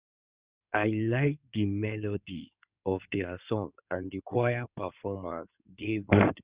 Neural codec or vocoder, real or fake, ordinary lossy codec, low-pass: codec, 16 kHz in and 24 kHz out, 2.2 kbps, FireRedTTS-2 codec; fake; Opus, 64 kbps; 3.6 kHz